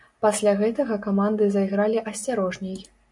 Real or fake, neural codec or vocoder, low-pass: real; none; 10.8 kHz